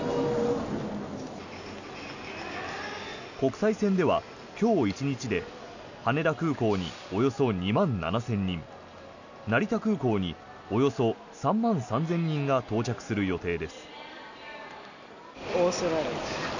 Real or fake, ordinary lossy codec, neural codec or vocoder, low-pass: real; none; none; 7.2 kHz